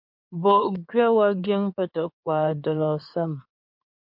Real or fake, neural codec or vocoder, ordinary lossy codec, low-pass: fake; codec, 16 kHz in and 24 kHz out, 2.2 kbps, FireRedTTS-2 codec; MP3, 48 kbps; 5.4 kHz